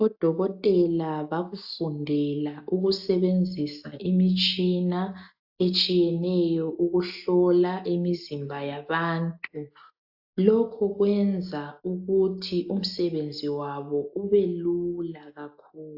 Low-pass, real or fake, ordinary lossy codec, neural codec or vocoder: 5.4 kHz; real; AAC, 48 kbps; none